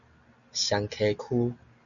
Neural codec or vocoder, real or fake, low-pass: none; real; 7.2 kHz